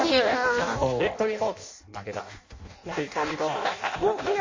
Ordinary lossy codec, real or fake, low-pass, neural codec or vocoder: MP3, 32 kbps; fake; 7.2 kHz; codec, 16 kHz in and 24 kHz out, 0.6 kbps, FireRedTTS-2 codec